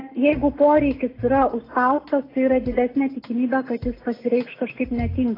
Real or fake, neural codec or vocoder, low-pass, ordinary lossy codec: real; none; 5.4 kHz; AAC, 24 kbps